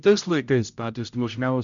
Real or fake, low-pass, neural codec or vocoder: fake; 7.2 kHz; codec, 16 kHz, 0.5 kbps, X-Codec, HuBERT features, trained on general audio